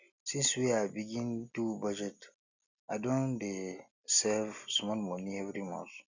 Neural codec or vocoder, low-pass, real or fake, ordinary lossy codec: none; 7.2 kHz; real; none